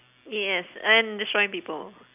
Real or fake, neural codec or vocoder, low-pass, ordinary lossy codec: real; none; 3.6 kHz; none